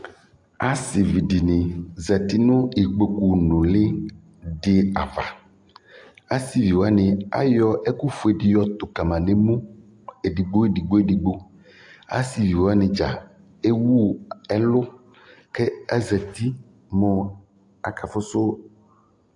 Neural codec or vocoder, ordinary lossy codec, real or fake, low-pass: none; AAC, 64 kbps; real; 10.8 kHz